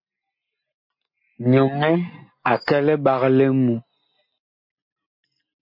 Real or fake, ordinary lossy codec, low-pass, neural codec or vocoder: real; MP3, 32 kbps; 5.4 kHz; none